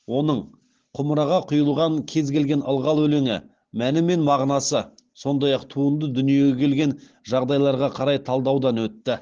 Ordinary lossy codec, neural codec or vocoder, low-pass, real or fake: Opus, 16 kbps; none; 7.2 kHz; real